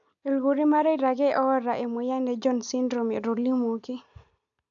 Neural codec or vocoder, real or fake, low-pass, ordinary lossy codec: none; real; 7.2 kHz; AAC, 64 kbps